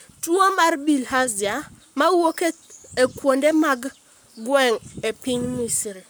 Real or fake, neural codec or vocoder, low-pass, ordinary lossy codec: fake; codec, 44.1 kHz, 7.8 kbps, Pupu-Codec; none; none